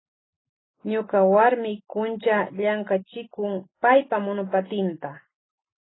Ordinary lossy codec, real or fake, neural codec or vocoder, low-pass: AAC, 16 kbps; real; none; 7.2 kHz